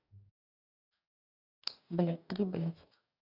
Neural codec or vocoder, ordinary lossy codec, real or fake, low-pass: codec, 44.1 kHz, 2.6 kbps, DAC; none; fake; 5.4 kHz